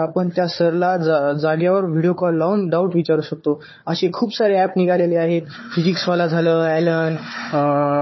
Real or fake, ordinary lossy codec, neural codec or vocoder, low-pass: fake; MP3, 24 kbps; codec, 16 kHz, 4 kbps, FunCodec, trained on Chinese and English, 50 frames a second; 7.2 kHz